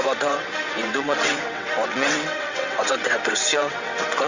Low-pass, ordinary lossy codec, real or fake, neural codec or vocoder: 7.2 kHz; Opus, 64 kbps; fake; vocoder, 22.05 kHz, 80 mel bands, WaveNeXt